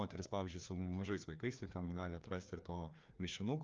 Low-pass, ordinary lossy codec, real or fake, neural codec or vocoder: 7.2 kHz; Opus, 24 kbps; fake; codec, 16 kHz, 2 kbps, FreqCodec, larger model